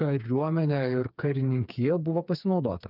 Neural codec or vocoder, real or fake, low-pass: codec, 16 kHz, 4 kbps, FreqCodec, smaller model; fake; 5.4 kHz